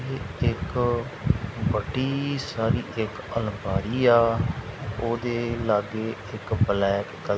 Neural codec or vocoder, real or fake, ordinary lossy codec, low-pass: none; real; none; none